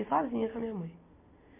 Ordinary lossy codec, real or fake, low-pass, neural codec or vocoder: MP3, 24 kbps; real; 3.6 kHz; none